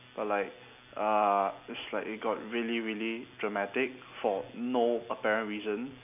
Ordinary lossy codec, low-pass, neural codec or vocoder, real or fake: none; 3.6 kHz; none; real